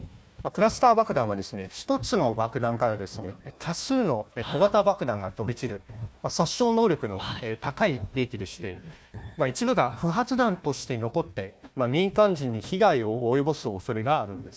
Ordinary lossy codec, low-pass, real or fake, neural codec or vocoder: none; none; fake; codec, 16 kHz, 1 kbps, FunCodec, trained on Chinese and English, 50 frames a second